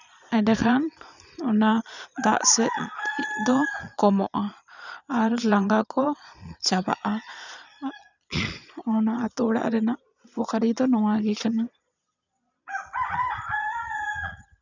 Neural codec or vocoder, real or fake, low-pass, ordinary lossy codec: vocoder, 22.05 kHz, 80 mel bands, Vocos; fake; 7.2 kHz; none